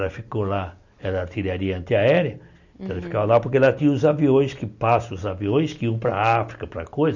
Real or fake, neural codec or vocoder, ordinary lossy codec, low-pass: real; none; none; 7.2 kHz